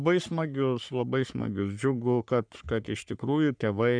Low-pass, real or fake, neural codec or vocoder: 9.9 kHz; fake; codec, 44.1 kHz, 3.4 kbps, Pupu-Codec